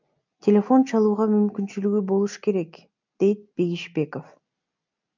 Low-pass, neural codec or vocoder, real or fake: 7.2 kHz; none; real